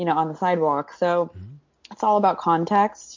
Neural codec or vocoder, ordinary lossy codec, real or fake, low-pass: none; MP3, 48 kbps; real; 7.2 kHz